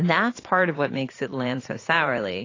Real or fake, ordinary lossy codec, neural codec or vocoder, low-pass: fake; AAC, 32 kbps; vocoder, 44.1 kHz, 80 mel bands, Vocos; 7.2 kHz